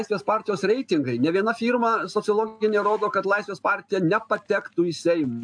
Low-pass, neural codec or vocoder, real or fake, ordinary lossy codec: 9.9 kHz; none; real; AAC, 64 kbps